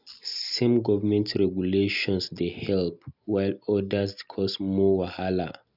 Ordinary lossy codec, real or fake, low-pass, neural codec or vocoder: none; real; 5.4 kHz; none